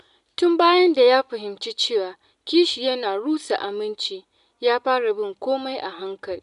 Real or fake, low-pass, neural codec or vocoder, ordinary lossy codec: real; 10.8 kHz; none; none